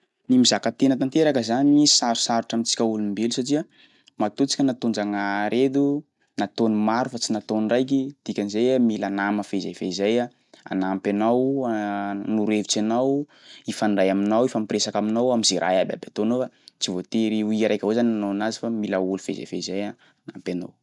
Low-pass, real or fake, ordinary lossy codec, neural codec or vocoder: 10.8 kHz; real; none; none